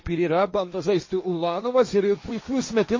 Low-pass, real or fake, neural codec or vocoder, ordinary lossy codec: 7.2 kHz; fake; codec, 16 kHz, 1.1 kbps, Voila-Tokenizer; MP3, 32 kbps